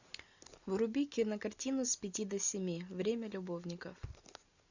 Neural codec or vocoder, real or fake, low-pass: none; real; 7.2 kHz